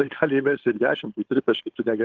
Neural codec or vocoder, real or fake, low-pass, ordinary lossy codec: codec, 16 kHz, 4.8 kbps, FACodec; fake; 7.2 kHz; Opus, 16 kbps